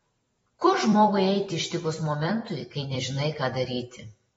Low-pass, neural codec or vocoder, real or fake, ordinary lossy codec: 19.8 kHz; vocoder, 44.1 kHz, 128 mel bands every 256 samples, BigVGAN v2; fake; AAC, 24 kbps